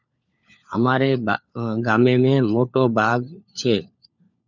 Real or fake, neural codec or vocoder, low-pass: fake; codec, 16 kHz, 4 kbps, FunCodec, trained on LibriTTS, 50 frames a second; 7.2 kHz